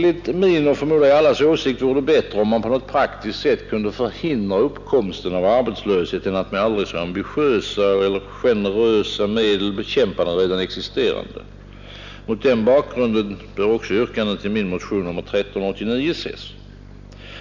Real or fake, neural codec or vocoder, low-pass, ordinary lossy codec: real; none; 7.2 kHz; none